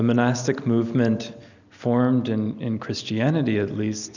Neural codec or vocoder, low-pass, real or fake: none; 7.2 kHz; real